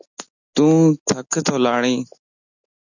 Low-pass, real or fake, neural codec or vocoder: 7.2 kHz; real; none